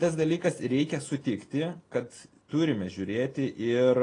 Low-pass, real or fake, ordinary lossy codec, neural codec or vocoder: 9.9 kHz; real; AAC, 32 kbps; none